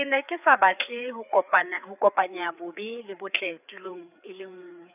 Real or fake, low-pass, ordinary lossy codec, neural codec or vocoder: fake; 3.6 kHz; none; codec, 16 kHz, 4 kbps, FreqCodec, larger model